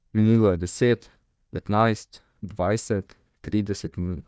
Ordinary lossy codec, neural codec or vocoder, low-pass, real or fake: none; codec, 16 kHz, 1 kbps, FunCodec, trained on Chinese and English, 50 frames a second; none; fake